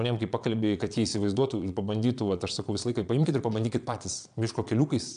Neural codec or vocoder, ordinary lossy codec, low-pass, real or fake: vocoder, 22.05 kHz, 80 mel bands, Vocos; AAC, 96 kbps; 9.9 kHz; fake